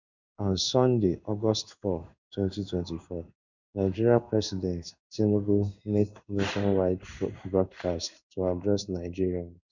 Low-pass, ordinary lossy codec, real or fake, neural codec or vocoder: 7.2 kHz; none; fake; codec, 16 kHz in and 24 kHz out, 1 kbps, XY-Tokenizer